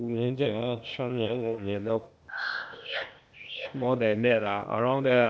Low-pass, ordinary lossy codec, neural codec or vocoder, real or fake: none; none; codec, 16 kHz, 0.8 kbps, ZipCodec; fake